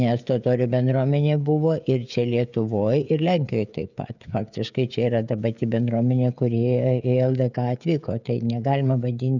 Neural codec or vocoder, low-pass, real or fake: codec, 16 kHz, 16 kbps, FreqCodec, smaller model; 7.2 kHz; fake